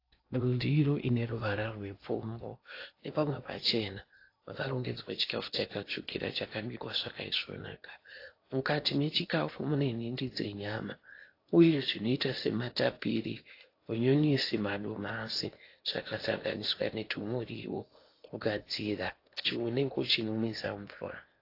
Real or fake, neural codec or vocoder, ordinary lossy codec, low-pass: fake; codec, 16 kHz in and 24 kHz out, 0.8 kbps, FocalCodec, streaming, 65536 codes; AAC, 32 kbps; 5.4 kHz